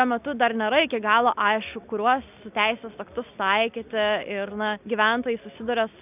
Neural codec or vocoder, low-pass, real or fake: none; 3.6 kHz; real